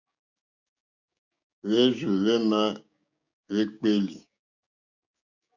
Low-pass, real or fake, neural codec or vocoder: 7.2 kHz; fake; codec, 16 kHz, 6 kbps, DAC